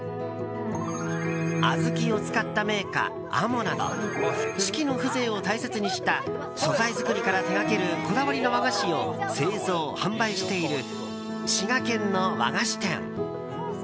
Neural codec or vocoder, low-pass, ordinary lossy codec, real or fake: none; none; none; real